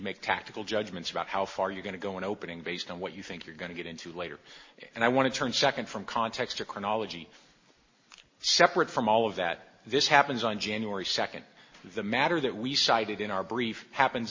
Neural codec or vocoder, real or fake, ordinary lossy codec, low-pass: none; real; MP3, 32 kbps; 7.2 kHz